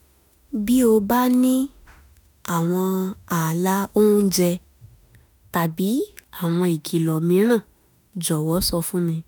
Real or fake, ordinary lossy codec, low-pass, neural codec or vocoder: fake; none; none; autoencoder, 48 kHz, 32 numbers a frame, DAC-VAE, trained on Japanese speech